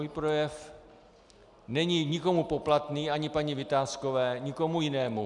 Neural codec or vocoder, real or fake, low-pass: none; real; 10.8 kHz